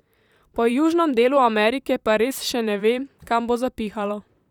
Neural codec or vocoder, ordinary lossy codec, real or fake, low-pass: vocoder, 44.1 kHz, 128 mel bands, Pupu-Vocoder; none; fake; 19.8 kHz